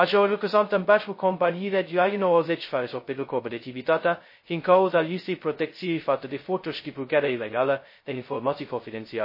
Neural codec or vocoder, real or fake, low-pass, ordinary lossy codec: codec, 16 kHz, 0.2 kbps, FocalCodec; fake; 5.4 kHz; MP3, 24 kbps